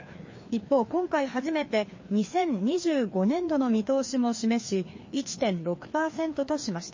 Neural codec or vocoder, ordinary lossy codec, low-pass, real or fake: codec, 16 kHz, 2 kbps, FreqCodec, larger model; MP3, 32 kbps; 7.2 kHz; fake